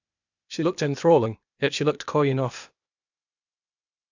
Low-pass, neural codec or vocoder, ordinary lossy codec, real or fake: 7.2 kHz; codec, 16 kHz, 0.8 kbps, ZipCodec; none; fake